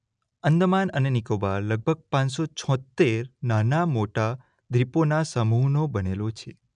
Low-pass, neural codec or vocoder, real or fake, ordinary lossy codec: 9.9 kHz; none; real; none